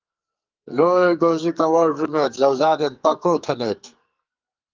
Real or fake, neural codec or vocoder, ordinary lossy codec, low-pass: fake; codec, 44.1 kHz, 2.6 kbps, SNAC; Opus, 24 kbps; 7.2 kHz